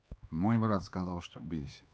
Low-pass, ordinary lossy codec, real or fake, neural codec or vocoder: none; none; fake; codec, 16 kHz, 2 kbps, X-Codec, HuBERT features, trained on LibriSpeech